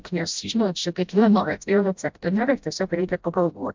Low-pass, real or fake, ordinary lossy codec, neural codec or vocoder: 7.2 kHz; fake; MP3, 64 kbps; codec, 16 kHz, 0.5 kbps, FreqCodec, smaller model